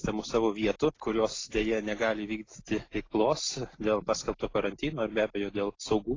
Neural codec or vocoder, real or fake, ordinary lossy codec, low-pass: none; real; AAC, 32 kbps; 7.2 kHz